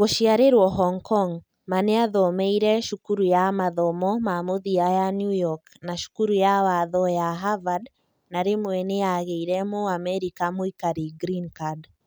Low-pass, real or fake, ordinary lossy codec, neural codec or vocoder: none; real; none; none